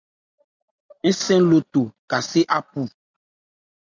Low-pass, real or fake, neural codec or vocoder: 7.2 kHz; real; none